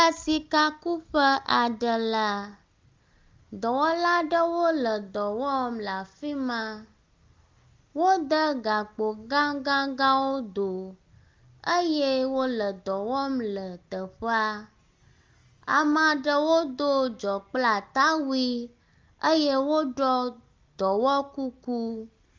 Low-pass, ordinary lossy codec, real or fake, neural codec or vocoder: 7.2 kHz; Opus, 32 kbps; real; none